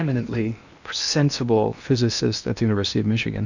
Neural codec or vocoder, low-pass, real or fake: codec, 16 kHz in and 24 kHz out, 0.8 kbps, FocalCodec, streaming, 65536 codes; 7.2 kHz; fake